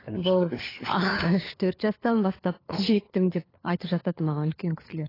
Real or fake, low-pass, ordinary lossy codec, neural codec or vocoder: fake; 5.4 kHz; AAC, 24 kbps; codec, 16 kHz, 4 kbps, FunCodec, trained on LibriTTS, 50 frames a second